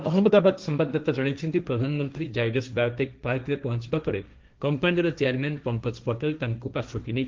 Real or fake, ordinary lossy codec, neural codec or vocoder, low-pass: fake; Opus, 24 kbps; codec, 16 kHz, 1.1 kbps, Voila-Tokenizer; 7.2 kHz